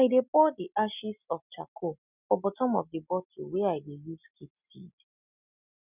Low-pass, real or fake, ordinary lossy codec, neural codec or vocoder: 3.6 kHz; real; none; none